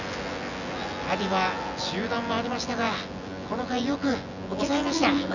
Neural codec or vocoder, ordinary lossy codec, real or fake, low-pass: vocoder, 24 kHz, 100 mel bands, Vocos; none; fake; 7.2 kHz